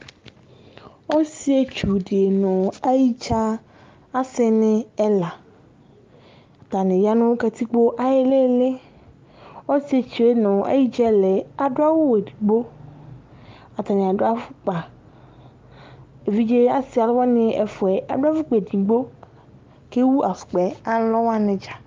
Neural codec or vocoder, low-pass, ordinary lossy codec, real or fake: none; 7.2 kHz; Opus, 32 kbps; real